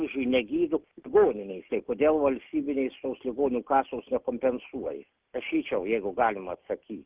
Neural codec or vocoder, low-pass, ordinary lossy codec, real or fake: none; 3.6 kHz; Opus, 24 kbps; real